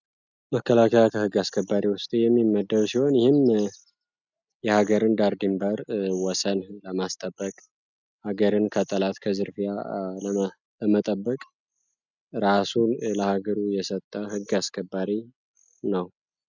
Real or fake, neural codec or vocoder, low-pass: real; none; 7.2 kHz